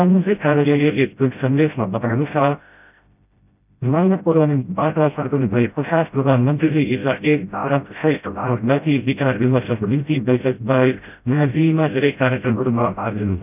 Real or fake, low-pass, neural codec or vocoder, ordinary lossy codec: fake; 3.6 kHz; codec, 16 kHz, 0.5 kbps, FreqCodec, smaller model; none